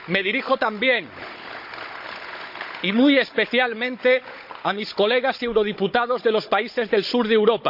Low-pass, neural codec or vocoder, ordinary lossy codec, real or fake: 5.4 kHz; codec, 16 kHz, 16 kbps, FunCodec, trained on Chinese and English, 50 frames a second; MP3, 48 kbps; fake